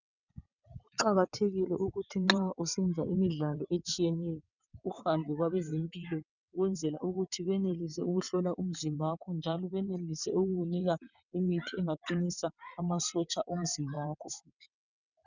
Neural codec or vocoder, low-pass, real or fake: vocoder, 22.05 kHz, 80 mel bands, WaveNeXt; 7.2 kHz; fake